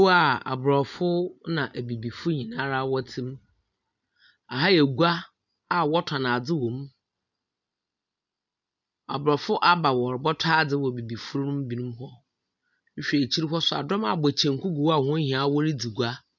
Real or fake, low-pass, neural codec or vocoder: real; 7.2 kHz; none